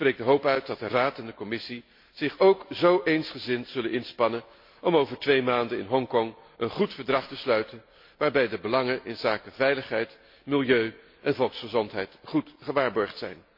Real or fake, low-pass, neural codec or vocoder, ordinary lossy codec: real; 5.4 kHz; none; MP3, 32 kbps